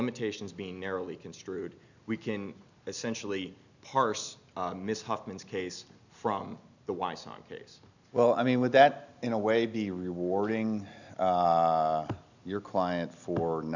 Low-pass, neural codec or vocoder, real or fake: 7.2 kHz; none; real